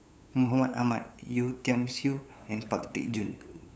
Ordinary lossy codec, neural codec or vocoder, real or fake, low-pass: none; codec, 16 kHz, 8 kbps, FunCodec, trained on LibriTTS, 25 frames a second; fake; none